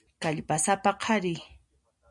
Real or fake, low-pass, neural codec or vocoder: real; 10.8 kHz; none